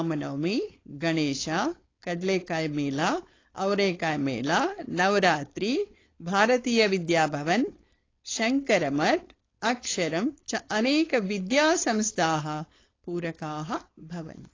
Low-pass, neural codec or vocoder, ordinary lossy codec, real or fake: 7.2 kHz; codec, 16 kHz, 4.8 kbps, FACodec; AAC, 32 kbps; fake